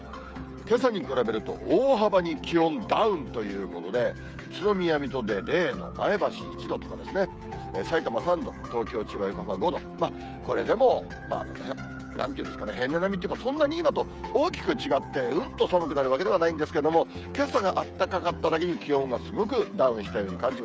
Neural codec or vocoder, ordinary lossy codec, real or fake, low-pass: codec, 16 kHz, 8 kbps, FreqCodec, smaller model; none; fake; none